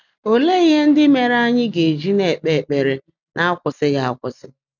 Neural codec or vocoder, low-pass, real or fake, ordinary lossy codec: none; 7.2 kHz; real; none